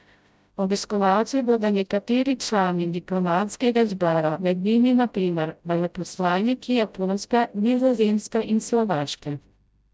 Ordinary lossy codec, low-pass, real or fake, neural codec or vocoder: none; none; fake; codec, 16 kHz, 0.5 kbps, FreqCodec, smaller model